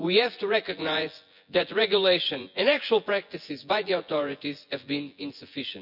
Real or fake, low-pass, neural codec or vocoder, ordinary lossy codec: fake; 5.4 kHz; vocoder, 24 kHz, 100 mel bands, Vocos; none